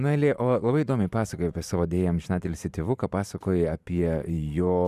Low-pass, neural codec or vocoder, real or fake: 14.4 kHz; vocoder, 44.1 kHz, 128 mel bands every 512 samples, BigVGAN v2; fake